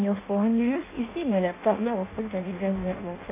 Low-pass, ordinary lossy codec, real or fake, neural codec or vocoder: 3.6 kHz; MP3, 24 kbps; fake; codec, 16 kHz in and 24 kHz out, 0.6 kbps, FireRedTTS-2 codec